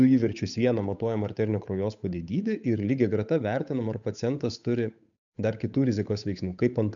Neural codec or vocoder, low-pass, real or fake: codec, 16 kHz, 8 kbps, FunCodec, trained on Chinese and English, 25 frames a second; 7.2 kHz; fake